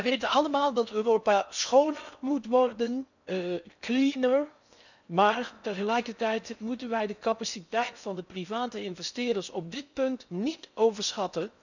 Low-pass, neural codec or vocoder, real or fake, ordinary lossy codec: 7.2 kHz; codec, 16 kHz in and 24 kHz out, 0.6 kbps, FocalCodec, streaming, 4096 codes; fake; none